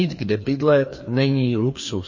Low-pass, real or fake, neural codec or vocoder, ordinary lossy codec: 7.2 kHz; fake; codec, 16 kHz, 2 kbps, FreqCodec, larger model; MP3, 32 kbps